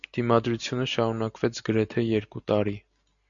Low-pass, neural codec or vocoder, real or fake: 7.2 kHz; none; real